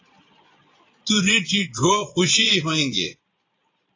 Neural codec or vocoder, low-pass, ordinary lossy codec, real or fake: vocoder, 22.05 kHz, 80 mel bands, Vocos; 7.2 kHz; AAC, 32 kbps; fake